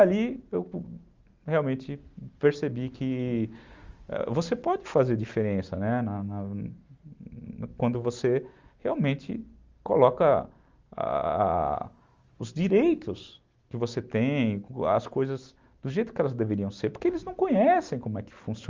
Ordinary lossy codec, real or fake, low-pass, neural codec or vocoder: Opus, 32 kbps; real; 7.2 kHz; none